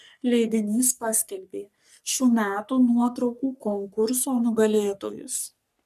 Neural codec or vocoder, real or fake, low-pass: codec, 44.1 kHz, 3.4 kbps, Pupu-Codec; fake; 14.4 kHz